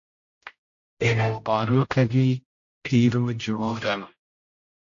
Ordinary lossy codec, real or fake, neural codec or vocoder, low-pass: AAC, 48 kbps; fake; codec, 16 kHz, 0.5 kbps, X-Codec, HuBERT features, trained on general audio; 7.2 kHz